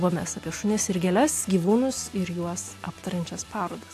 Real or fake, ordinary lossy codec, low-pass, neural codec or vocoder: real; AAC, 64 kbps; 14.4 kHz; none